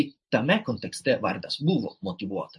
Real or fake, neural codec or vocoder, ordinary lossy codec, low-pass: fake; vocoder, 44.1 kHz, 128 mel bands every 512 samples, BigVGAN v2; MP3, 48 kbps; 10.8 kHz